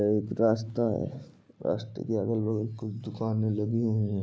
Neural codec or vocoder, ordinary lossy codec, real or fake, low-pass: none; none; real; none